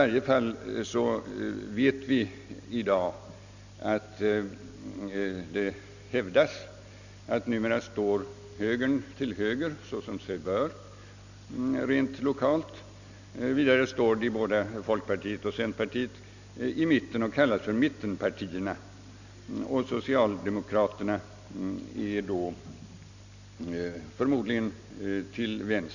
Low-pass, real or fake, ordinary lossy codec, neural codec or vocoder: 7.2 kHz; real; none; none